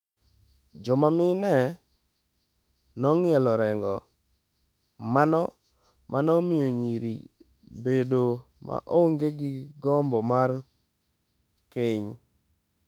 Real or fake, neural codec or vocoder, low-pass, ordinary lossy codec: fake; autoencoder, 48 kHz, 32 numbers a frame, DAC-VAE, trained on Japanese speech; 19.8 kHz; none